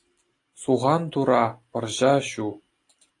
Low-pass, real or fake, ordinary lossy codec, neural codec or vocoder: 10.8 kHz; real; AAC, 48 kbps; none